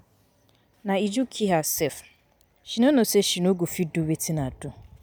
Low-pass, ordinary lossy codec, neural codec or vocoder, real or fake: none; none; none; real